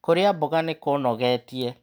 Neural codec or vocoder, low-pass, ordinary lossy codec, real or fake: none; none; none; real